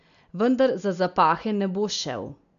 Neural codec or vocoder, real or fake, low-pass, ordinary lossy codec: none; real; 7.2 kHz; none